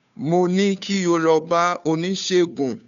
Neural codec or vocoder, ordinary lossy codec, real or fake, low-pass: codec, 16 kHz, 2 kbps, FunCodec, trained on Chinese and English, 25 frames a second; none; fake; 7.2 kHz